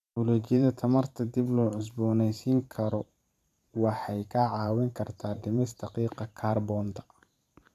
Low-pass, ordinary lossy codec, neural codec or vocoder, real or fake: 14.4 kHz; none; vocoder, 44.1 kHz, 128 mel bands every 512 samples, BigVGAN v2; fake